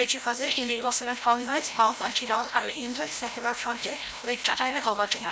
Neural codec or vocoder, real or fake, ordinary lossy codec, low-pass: codec, 16 kHz, 0.5 kbps, FreqCodec, larger model; fake; none; none